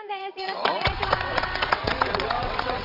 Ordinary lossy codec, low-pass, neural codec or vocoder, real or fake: none; 5.4 kHz; vocoder, 22.05 kHz, 80 mel bands, WaveNeXt; fake